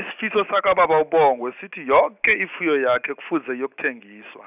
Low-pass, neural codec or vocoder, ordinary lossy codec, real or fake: 3.6 kHz; none; none; real